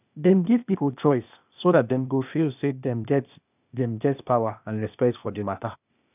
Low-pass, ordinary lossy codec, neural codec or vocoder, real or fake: 3.6 kHz; none; codec, 16 kHz, 0.8 kbps, ZipCodec; fake